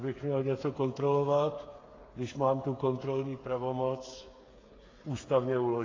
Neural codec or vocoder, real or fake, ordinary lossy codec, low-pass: codec, 16 kHz, 8 kbps, FreqCodec, smaller model; fake; AAC, 32 kbps; 7.2 kHz